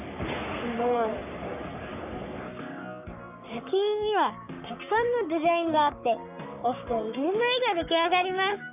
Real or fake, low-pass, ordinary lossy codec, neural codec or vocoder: fake; 3.6 kHz; none; codec, 44.1 kHz, 3.4 kbps, Pupu-Codec